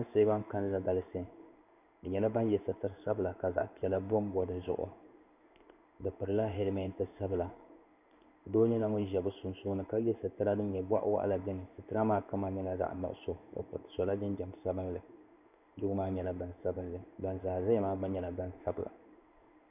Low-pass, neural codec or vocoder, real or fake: 3.6 kHz; codec, 16 kHz in and 24 kHz out, 1 kbps, XY-Tokenizer; fake